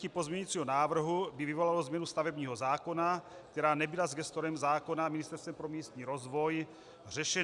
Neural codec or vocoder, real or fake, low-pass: none; real; 10.8 kHz